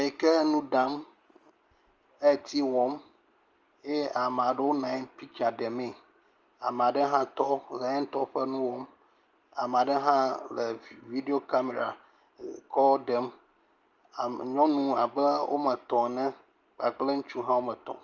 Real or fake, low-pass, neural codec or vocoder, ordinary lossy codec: real; 7.2 kHz; none; Opus, 24 kbps